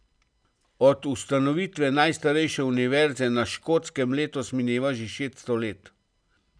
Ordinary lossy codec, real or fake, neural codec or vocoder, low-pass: none; real; none; 9.9 kHz